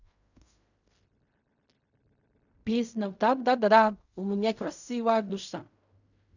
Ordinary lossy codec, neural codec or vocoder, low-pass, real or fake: none; codec, 16 kHz in and 24 kHz out, 0.4 kbps, LongCat-Audio-Codec, fine tuned four codebook decoder; 7.2 kHz; fake